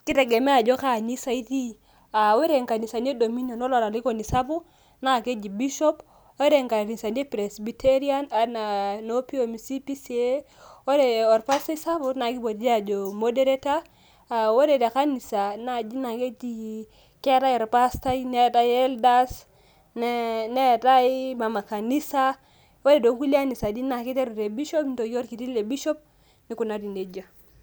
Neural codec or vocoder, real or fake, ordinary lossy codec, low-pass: none; real; none; none